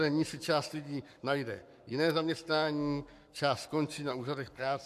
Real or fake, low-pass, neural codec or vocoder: fake; 14.4 kHz; codec, 44.1 kHz, 7.8 kbps, Pupu-Codec